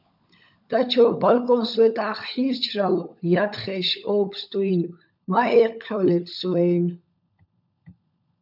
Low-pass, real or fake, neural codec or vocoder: 5.4 kHz; fake; codec, 16 kHz, 16 kbps, FunCodec, trained on LibriTTS, 50 frames a second